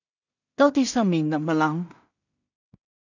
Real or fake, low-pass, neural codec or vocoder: fake; 7.2 kHz; codec, 16 kHz in and 24 kHz out, 0.4 kbps, LongCat-Audio-Codec, two codebook decoder